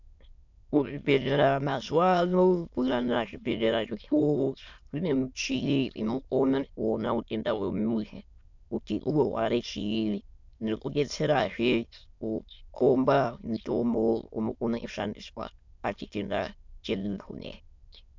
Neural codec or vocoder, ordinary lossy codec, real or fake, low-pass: autoencoder, 22.05 kHz, a latent of 192 numbers a frame, VITS, trained on many speakers; MP3, 64 kbps; fake; 7.2 kHz